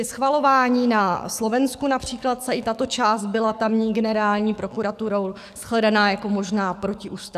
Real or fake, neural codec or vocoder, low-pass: fake; codec, 44.1 kHz, 7.8 kbps, DAC; 14.4 kHz